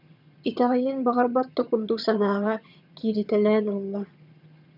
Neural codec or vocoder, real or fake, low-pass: vocoder, 22.05 kHz, 80 mel bands, HiFi-GAN; fake; 5.4 kHz